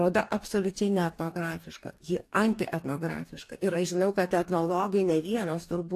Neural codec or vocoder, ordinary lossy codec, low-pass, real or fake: codec, 44.1 kHz, 2.6 kbps, DAC; AAC, 64 kbps; 14.4 kHz; fake